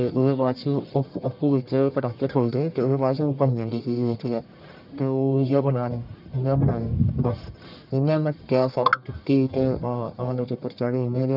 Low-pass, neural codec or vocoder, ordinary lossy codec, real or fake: 5.4 kHz; codec, 44.1 kHz, 1.7 kbps, Pupu-Codec; none; fake